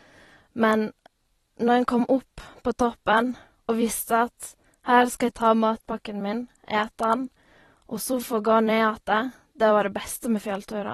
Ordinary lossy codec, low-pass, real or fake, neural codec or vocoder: AAC, 32 kbps; 19.8 kHz; fake; vocoder, 44.1 kHz, 128 mel bands every 256 samples, BigVGAN v2